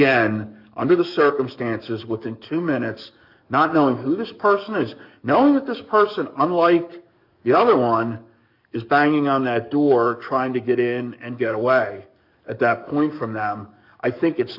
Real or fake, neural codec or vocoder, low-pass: fake; codec, 44.1 kHz, 7.8 kbps, DAC; 5.4 kHz